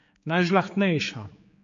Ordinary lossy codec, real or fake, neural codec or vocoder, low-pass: MP3, 48 kbps; fake; codec, 16 kHz, 4 kbps, X-Codec, HuBERT features, trained on general audio; 7.2 kHz